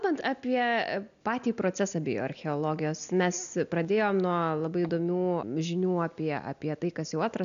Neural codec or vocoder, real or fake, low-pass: none; real; 7.2 kHz